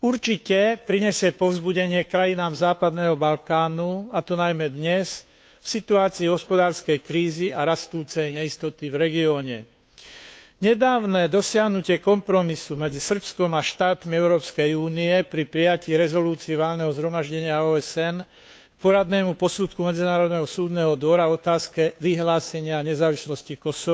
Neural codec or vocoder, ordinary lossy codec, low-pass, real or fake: codec, 16 kHz, 2 kbps, FunCodec, trained on Chinese and English, 25 frames a second; none; none; fake